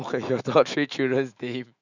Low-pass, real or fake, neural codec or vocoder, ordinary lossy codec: 7.2 kHz; fake; autoencoder, 48 kHz, 128 numbers a frame, DAC-VAE, trained on Japanese speech; none